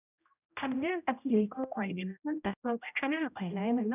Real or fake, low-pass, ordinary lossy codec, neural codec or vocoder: fake; 3.6 kHz; none; codec, 16 kHz, 0.5 kbps, X-Codec, HuBERT features, trained on general audio